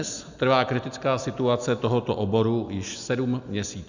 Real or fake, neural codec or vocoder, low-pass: real; none; 7.2 kHz